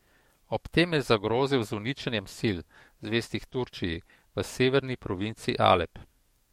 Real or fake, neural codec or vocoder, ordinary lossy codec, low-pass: fake; codec, 44.1 kHz, 7.8 kbps, DAC; MP3, 64 kbps; 19.8 kHz